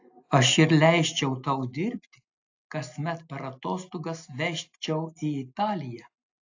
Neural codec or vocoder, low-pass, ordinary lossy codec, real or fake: none; 7.2 kHz; AAC, 48 kbps; real